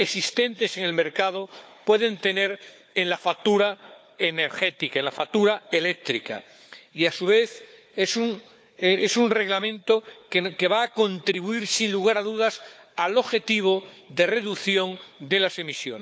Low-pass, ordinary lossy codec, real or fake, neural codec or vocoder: none; none; fake; codec, 16 kHz, 4 kbps, FunCodec, trained on Chinese and English, 50 frames a second